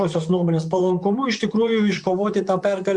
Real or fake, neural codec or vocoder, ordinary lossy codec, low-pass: fake; vocoder, 44.1 kHz, 128 mel bands, Pupu-Vocoder; Opus, 64 kbps; 10.8 kHz